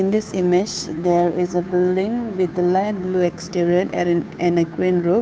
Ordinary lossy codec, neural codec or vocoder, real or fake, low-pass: none; codec, 16 kHz, 2 kbps, FunCodec, trained on Chinese and English, 25 frames a second; fake; none